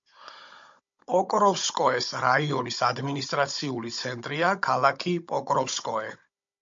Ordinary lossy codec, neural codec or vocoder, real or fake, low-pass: MP3, 48 kbps; codec, 16 kHz, 16 kbps, FunCodec, trained on Chinese and English, 50 frames a second; fake; 7.2 kHz